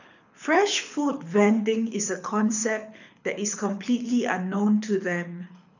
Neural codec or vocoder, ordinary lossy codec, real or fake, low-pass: codec, 24 kHz, 6 kbps, HILCodec; none; fake; 7.2 kHz